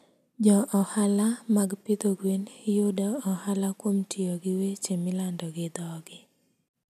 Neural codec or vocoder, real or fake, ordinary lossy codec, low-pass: none; real; none; 14.4 kHz